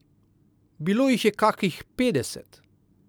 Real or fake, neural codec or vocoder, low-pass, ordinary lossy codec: real; none; none; none